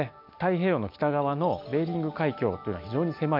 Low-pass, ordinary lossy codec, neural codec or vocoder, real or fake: 5.4 kHz; MP3, 48 kbps; none; real